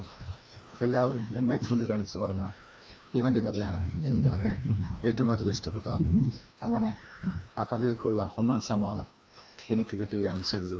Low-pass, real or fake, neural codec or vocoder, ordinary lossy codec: none; fake; codec, 16 kHz, 1 kbps, FreqCodec, larger model; none